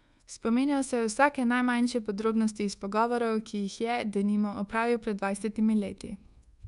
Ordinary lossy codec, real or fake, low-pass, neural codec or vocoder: none; fake; 10.8 kHz; codec, 24 kHz, 1.2 kbps, DualCodec